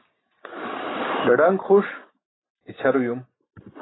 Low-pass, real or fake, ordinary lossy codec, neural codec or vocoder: 7.2 kHz; real; AAC, 16 kbps; none